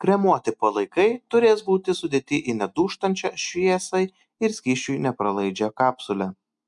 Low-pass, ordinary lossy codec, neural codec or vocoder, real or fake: 10.8 kHz; MP3, 96 kbps; none; real